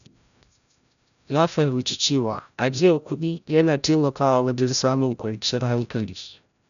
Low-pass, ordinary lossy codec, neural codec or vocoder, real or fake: 7.2 kHz; none; codec, 16 kHz, 0.5 kbps, FreqCodec, larger model; fake